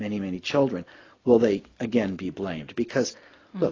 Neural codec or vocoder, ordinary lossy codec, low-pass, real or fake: none; AAC, 32 kbps; 7.2 kHz; real